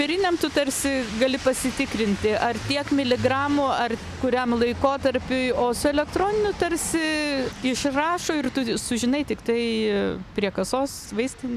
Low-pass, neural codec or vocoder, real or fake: 14.4 kHz; none; real